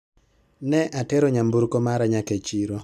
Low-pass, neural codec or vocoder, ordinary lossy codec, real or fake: 14.4 kHz; none; none; real